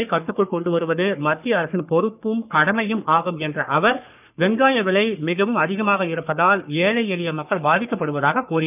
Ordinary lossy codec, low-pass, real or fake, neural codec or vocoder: none; 3.6 kHz; fake; codec, 44.1 kHz, 3.4 kbps, Pupu-Codec